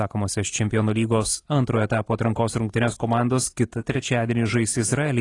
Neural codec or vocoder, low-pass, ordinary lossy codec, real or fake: vocoder, 44.1 kHz, 128 mel bands every 512 samples, BigVGAN v2; 10.8 kHz; AAC, 32 kbps; fake